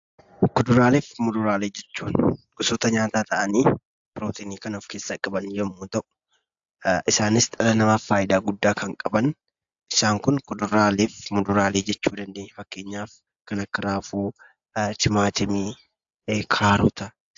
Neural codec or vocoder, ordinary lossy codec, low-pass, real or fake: none; AAC, 64 kbps; 7.2 kHz; real